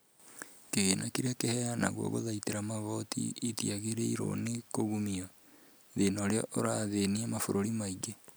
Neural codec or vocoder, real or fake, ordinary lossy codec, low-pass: none; real; none; none